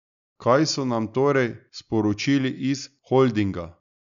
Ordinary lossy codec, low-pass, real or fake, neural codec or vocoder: MP3, 96 kbps; 7.2 kHz; real; none